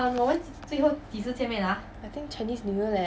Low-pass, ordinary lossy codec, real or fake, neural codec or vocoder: none; none; real; none